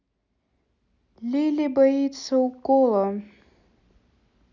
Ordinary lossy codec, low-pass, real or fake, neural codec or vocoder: none; 7.2 kHz; real; none